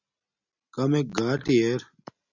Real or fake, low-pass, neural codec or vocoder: real; 7.2 kHz; none